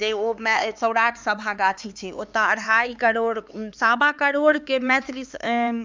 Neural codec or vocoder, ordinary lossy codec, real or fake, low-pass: codec, 16 kHz, 4 kbps, X-Codec, HuBERT features, trained on LibriSpeech; none; fake; none